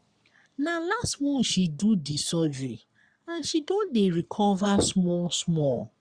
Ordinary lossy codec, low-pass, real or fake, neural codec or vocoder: Opus, 64 kbps; 9.9 kHz; fake; codec, 44.1 kHz, 3.4 kbps, Pupu-Codec